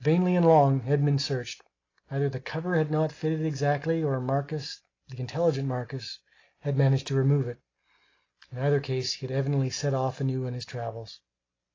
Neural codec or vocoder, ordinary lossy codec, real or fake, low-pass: none; AAC, 32 kbps; real; 7.2 kHz